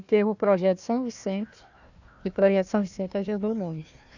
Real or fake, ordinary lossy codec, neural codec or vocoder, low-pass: fake; none; codec, 16 kHz, 1 kbps, FunCodec, trained on Chinese and English, 50 frames a second; 7.2 kHz